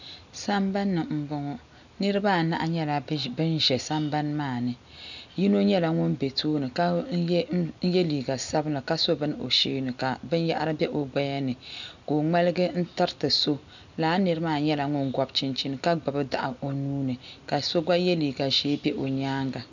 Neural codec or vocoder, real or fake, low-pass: none; real; 7.2 kHz